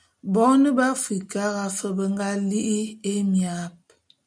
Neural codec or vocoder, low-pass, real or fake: none; 9.9 kHz; real